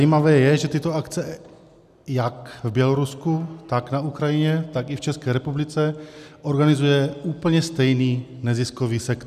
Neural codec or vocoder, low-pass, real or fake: none; 14.4 kHz; real